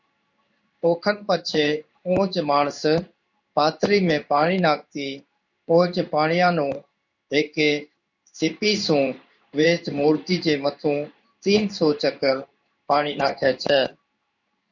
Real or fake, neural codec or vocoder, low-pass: fake; codec, 16 kHz in and 24 kHz out, 1 kbps, XY-Tokenizer; 7.2 kHz